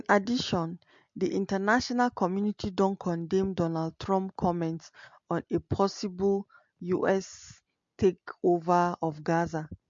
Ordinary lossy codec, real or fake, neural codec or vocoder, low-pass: MP3, 48 kbps; real; none; 7.2 kHz